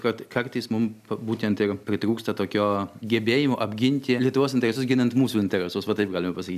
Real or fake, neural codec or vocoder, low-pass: real; none; 14.4 kHz